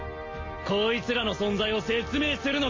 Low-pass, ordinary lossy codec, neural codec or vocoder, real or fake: 7.2 kHz; MP3, 32 kbps; none; real